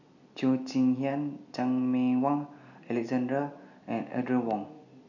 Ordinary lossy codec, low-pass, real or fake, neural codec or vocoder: none; 7.2 kHz; real; none